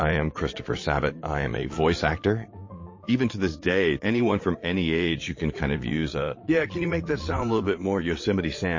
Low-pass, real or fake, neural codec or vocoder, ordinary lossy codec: 7.2 kHz; fake; vocoder, 22.05 kHz, 80 mel bands, Vocos; MP3, 32 kbps